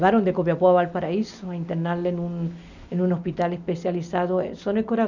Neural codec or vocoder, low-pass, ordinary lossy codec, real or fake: none; 7.2 kHz; none; real